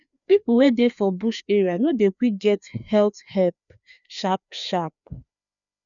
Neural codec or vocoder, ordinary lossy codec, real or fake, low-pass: codec, 16 kHz, 2 kbps, FreqCodec, larger model; none; fake; 7.2 kHz